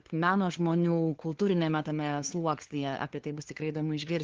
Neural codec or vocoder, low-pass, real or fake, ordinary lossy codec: codec, 16 kHz, 2 kbps, FunCodec, trained on LibriTTS, 25 frames a second; 7.2 kHz; fake; Opus, 16 kbps